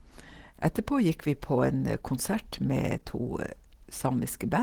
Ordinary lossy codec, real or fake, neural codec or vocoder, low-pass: Opus, 16 kbps; real; none; 19.8 kHz